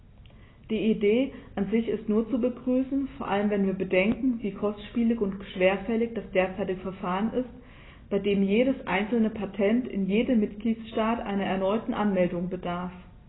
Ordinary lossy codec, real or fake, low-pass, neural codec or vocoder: AAC, 16 kbps; real; 7.2 kHz; none